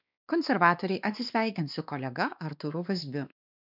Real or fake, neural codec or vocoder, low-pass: fake; codec, 16 kHz, 2 kbps, X-Codec, WavLM features, trained on Multilingual LibriSpeech; 5.4 kHz